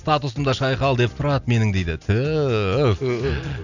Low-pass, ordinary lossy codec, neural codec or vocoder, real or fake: 7.2 kHz; none; none; real